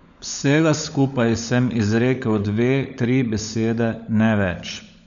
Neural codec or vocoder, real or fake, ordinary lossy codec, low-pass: codec, 16 kHz, 16 kbps, FunCodec, trained on LibriTTS, 50 frames a second; fake; none; 7.2 kHz